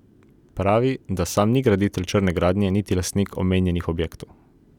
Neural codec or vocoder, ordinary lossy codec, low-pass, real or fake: none; none; 19.8 kHz; real